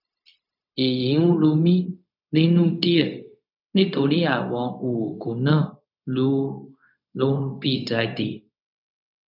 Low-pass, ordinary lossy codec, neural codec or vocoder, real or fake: 5.4 kHz; none; codec, 16 kHz, 0.4 kbps, LongCat-Audio-Codec; fake